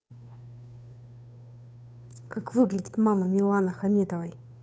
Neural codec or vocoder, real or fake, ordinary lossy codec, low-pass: codec, 16 kHz, 2 kbps, FunCodec, trained on Chinese and English, 25 frames a second; fake; none; none